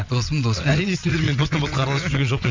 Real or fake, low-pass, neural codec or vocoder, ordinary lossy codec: fake; 7.2 kHz; vocoder, 22.05 kHz, 80 mel bands, WaveNeXt; MP3, 64 kbps